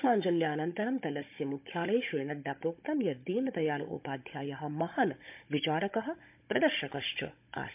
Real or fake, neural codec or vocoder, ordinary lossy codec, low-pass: fake; codec, 16 kHz, 16 kbps, FunCodec, trained on Chinese and English, 50 frames a second; MP3, 32 kbps; 3.6 kHz